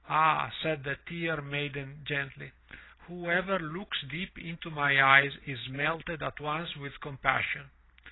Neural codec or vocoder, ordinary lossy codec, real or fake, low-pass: none; AAC, 16 kbps; real; 7.2 kHz